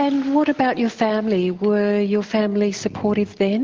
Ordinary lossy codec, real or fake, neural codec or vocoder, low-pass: Opus, 16 kbps; real; none; 7.2 kHz